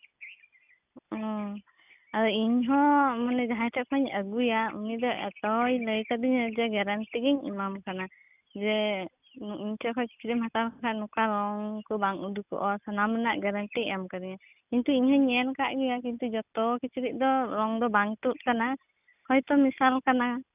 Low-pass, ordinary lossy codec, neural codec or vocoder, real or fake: 3.6 kHz; none; none; real